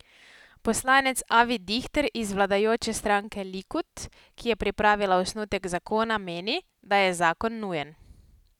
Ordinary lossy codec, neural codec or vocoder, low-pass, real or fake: none; none; 19.8 kHz; real